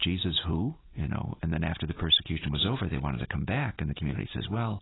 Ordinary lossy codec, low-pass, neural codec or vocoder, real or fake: AAC, 16 kbps; 7.2 kHz; none; real